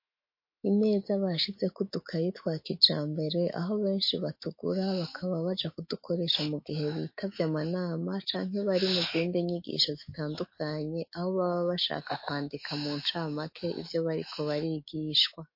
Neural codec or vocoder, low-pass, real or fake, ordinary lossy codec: autoencoder, 48 kHz, 128 numbers a frame, DAC-VAE, trained on Japanese speech; 5.4 kHz; fake; MP3, 32 kbps